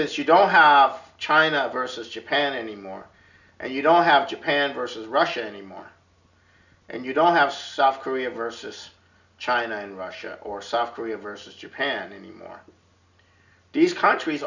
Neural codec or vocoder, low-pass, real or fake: none; 7.2 kHz; real